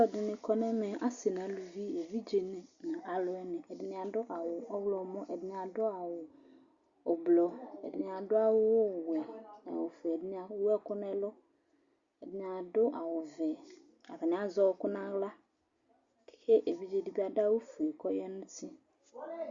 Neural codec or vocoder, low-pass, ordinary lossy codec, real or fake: none; 7.2 kHz; Opus, 64 kbps; real